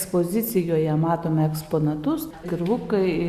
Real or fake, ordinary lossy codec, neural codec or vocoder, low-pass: real; Opus, 64 kbps; none; 14.4 kHz